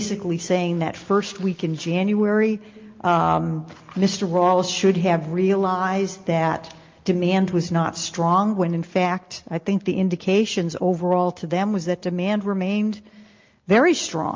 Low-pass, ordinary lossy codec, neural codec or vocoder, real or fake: 7.2 kHz; Opus, 24 kbps; none; real